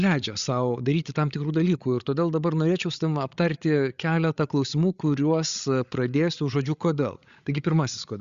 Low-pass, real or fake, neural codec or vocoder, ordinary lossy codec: 7.2 kHz; fake; codec, 16 kHz, 8 kbps, FreqCodec, larger model; Opus, 64 kbps